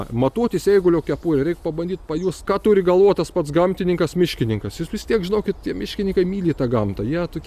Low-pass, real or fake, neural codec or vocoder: 14.4 kHz; real; none